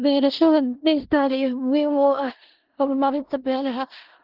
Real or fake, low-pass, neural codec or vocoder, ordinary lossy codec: fake; 5.4 kHz; codec, 16 kHz in and 24 kHz out, 0.4 kbps, LongCat-Audio-Codec, four codebook decoder; Opus, 16 kbps